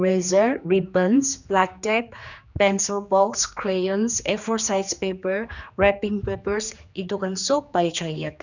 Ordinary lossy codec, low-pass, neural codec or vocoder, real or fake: none; 7.2 kHz; codec, 16 kHz, 2 kbps, X-Codec, HuBERT features, trained on general audio; fake